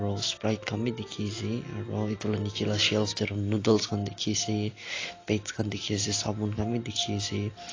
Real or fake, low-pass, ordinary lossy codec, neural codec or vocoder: real; 7.2 kHz; AAC, 32 kbps; none